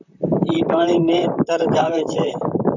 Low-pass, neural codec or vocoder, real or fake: 7.2 kHz; vocoder, 44.1 kHz, 128 mel bands, Pupu-Vocoder; fake